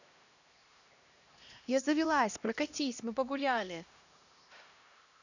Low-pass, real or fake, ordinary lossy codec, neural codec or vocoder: 7.2 kHz; fake; none; codec, 16 kHz, 1 kbps, X-Codec, HuBERT features, trained on LibriSpeech